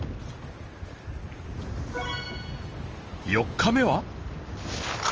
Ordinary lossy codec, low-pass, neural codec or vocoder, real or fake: Opus, 24 kbps; 7.2 kHz; none; real